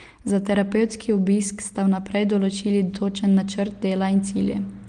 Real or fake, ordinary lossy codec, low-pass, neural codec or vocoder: real; Opus, 24 kbps; 9.9 kHz; none